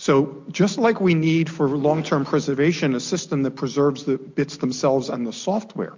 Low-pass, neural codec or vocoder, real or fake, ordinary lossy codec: 7.2 kHz; none; real; MP3, 48 kbps